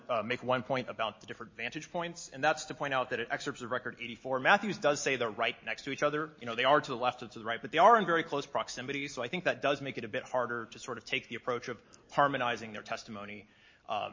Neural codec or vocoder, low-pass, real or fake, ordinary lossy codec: none; 7.2 kHz; real; MP3, 32 kbps